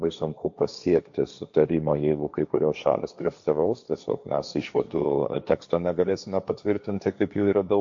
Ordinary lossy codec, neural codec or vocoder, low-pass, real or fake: AAC, 64 kbps; codec, 16 kHz, 1.1 kbps, Voila-Tokenizer; 7.2 kHz; fake